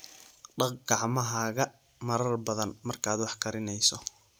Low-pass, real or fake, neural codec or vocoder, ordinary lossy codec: none; real; none; none